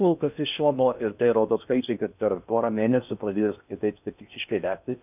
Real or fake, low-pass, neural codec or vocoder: fake; 3.6 kHz; codec, 16 kHz in and 24 kHz out, 0.6 kbps, FocalCodec, streaming, 2048 codes